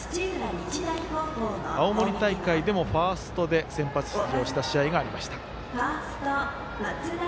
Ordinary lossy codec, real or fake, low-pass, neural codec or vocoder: none; real; none; none